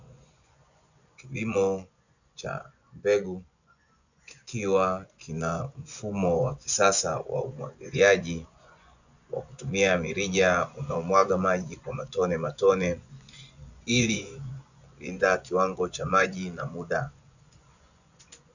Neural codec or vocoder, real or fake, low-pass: vocoder, 24 kHz, 100 mel bands, Vocos; fake; 7.2 kHz